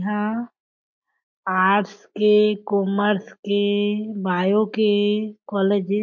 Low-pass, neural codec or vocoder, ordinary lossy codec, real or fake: 7.2 kHz; none; MP3, 48 kbps; real